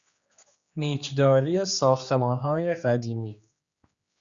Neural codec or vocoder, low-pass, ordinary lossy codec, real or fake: codec, 16 kHz, 2 kbps, X-Codec, HuBERT features, trained on general audio; 7.2 kHz; Opus, 64 kbps; fake